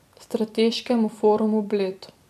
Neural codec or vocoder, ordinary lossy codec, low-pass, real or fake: vocoder, 44.1 kHz, 128 mel bands every 256 samples, BigVGAN v2; none; 14.4 kHz; fake